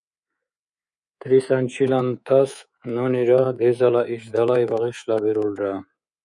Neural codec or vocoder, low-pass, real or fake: codec, 24 kHz, 3.1 kbps, DualCodec; 10.8 kHz; fake